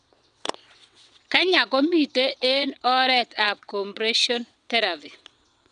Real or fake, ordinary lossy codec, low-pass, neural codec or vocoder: fake; none; 9.9 kHz; vocoder, 22.05 kHz, 80 mel bands, WaveNeXt